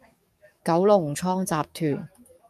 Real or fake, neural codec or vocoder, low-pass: fake; codec, 44.1 kHz, 7.8 kbps, DAC; 14.4 kHz